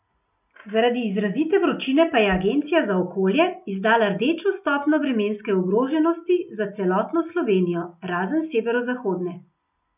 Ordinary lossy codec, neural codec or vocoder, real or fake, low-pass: none; none; real; 3.6 kHz